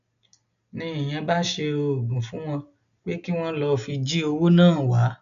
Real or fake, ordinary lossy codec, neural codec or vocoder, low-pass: real; none; none; 7.2 kHz